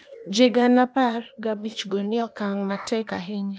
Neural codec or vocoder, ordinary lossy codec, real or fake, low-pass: codec, 16 kHz, 0.8 kbps, ZipCodec; none; fake; none